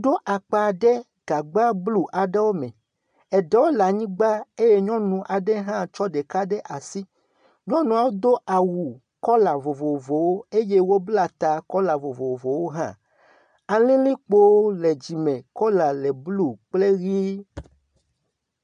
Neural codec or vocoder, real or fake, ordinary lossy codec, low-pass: none; real; AAC, 96 kbps; 9.9 kHz